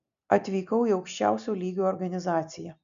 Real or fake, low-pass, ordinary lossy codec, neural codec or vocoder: real; 7.2 kHz; MP3, 64 kbps; none